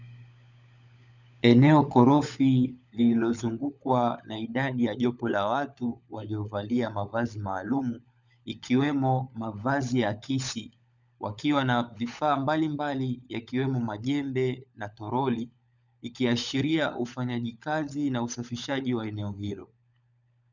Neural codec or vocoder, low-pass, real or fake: codec, 16 kHz, 16 kbps, FunCodec, trained on LibriTTS, 50 frames a second; 7.2 kHz; fake